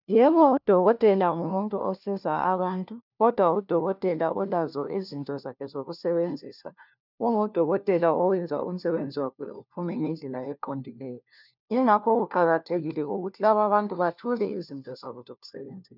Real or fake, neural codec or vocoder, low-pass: fake; codec, 16 kHz, 1 kbps, FunCodec, trained on LibriTTS, 50 frames a second; 5.4 kHz